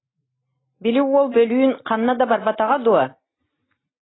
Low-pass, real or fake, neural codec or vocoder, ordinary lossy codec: 7.2 kHz; real; none; AAC, 16 kbps